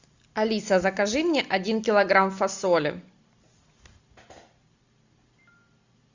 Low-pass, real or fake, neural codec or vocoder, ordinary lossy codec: 7.2 kHz; real; none; Opus, 64 kbps